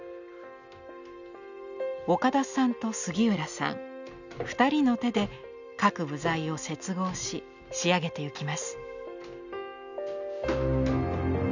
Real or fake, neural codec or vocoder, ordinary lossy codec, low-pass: real; none; none; 7.2 kHz